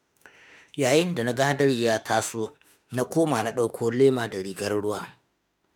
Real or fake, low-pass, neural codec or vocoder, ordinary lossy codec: fake; none; autoencoder, 48 kHz, 32 numbers a frame, DAC-VAE, trained on Japanese speech; none